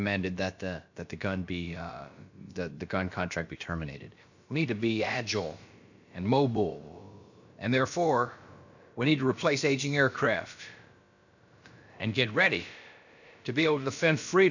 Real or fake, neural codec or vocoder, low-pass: fake; codec, 16 kHz, about 1 kbps, DyCAST, with the encoder's durations; 7.2 kHz